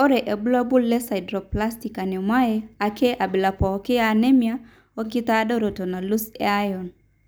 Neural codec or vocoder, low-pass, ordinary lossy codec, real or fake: none; none; none; real